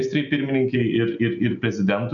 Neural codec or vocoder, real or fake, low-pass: none; real; 7.2 kHz